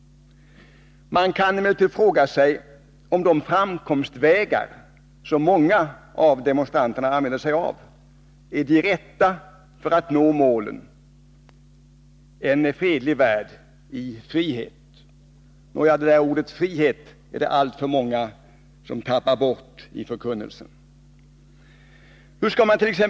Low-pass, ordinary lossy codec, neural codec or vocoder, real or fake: none; none; none; real